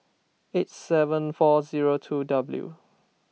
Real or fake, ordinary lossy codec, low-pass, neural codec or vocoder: real; none; none; none